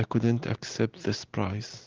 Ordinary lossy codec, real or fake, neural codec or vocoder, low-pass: Opus, 16 kbps; real; none; 7.2 kHz